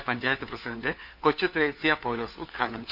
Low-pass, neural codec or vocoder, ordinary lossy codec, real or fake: 5.4 kHz; codec, 16 kHz, 4 kbps, FunCodec, trained on LibriTTS, 50 frames a second; none; fake